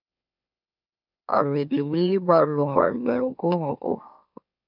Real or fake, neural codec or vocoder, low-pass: fake; autoencoder, 44.1 kHz, a latent of 192 numbers a frame, MeloTTS; 5.4 kHz